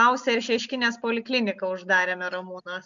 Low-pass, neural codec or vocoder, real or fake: 7.2 kHz; none; real